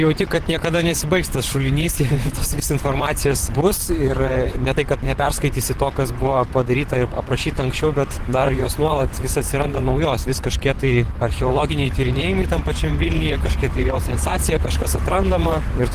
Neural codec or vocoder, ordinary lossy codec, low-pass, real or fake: vocoder, 44.1 kHz, 128 mel bands, Pupu-Vocoder; Opus, 16 kbps; 14.4 kHz; fake